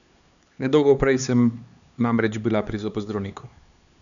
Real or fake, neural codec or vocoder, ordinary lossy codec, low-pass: fake; codec, 16 kHz, 4 kbps, X-Codec, HuBERT features, trained on LibriSpeech; none; 7.2 kHz